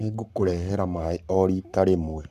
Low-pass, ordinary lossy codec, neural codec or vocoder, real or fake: 14.4 kHz; none; codec, 44.1 kHz, 7.8 kbps, Pupu-Codec; fake